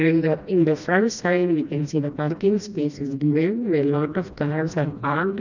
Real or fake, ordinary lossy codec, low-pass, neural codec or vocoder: fake; none; 7.2 kHz; codec, 16 kHz, 1 kbps, FreqCodec, smaller model